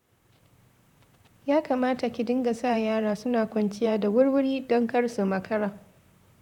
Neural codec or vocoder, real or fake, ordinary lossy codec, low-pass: vocoder, 44.1 kHz, 128 mel bands, Pupu-Vocoder; fake; none; 19.8 kHz